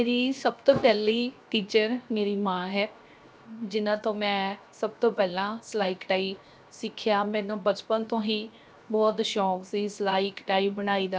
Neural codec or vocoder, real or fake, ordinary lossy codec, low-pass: codec, 16 kHz, 0.7 kbps, FocalCodec; fake; none; none